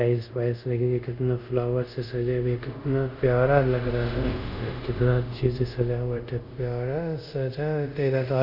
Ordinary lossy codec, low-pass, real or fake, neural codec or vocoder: none; 5.4 kHz; fake; codec, 24 kHz, 0.5 kbps, DualCodec